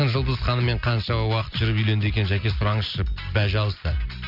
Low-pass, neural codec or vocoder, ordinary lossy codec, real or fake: 5.4 kHz; none; none; real